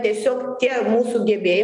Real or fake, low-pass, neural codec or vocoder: real; 10.8 kHz; none